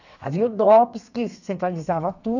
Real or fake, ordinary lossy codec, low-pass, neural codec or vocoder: fake; none; 7.2 kHz; codec, 32 kHz, 1.9 kbps, SNAC